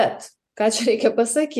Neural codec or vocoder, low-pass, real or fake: none; 14.4 kHz; real